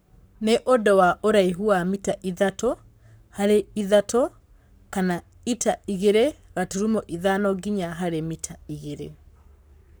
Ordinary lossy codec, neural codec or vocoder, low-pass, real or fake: none; codec, 44.1 kHz, 7.8 kbps, Pupu-Codec; none; fake